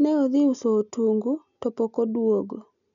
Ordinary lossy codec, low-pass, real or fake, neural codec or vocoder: none; 7.2 kHz; real; none